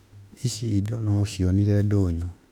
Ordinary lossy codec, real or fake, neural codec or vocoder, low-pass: none; fake; autoencoder, 48 kHz, 32 numbers a frame, DAC-VAE, trained on Japanese speech; 19.8 kHz